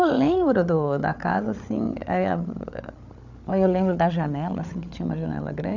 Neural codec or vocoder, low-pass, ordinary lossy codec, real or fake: codec, 16 kHz, 8 kbps, FreqCodec, larger model; 7.2 kHz; none; fake